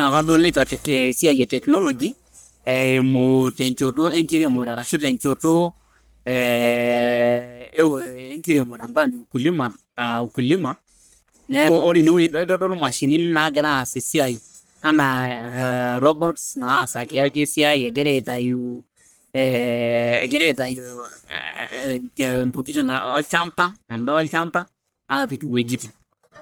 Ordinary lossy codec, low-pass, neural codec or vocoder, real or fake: none; none; codec, 44.1 kHz, 1.7 kbps, Pupu-Codec; fake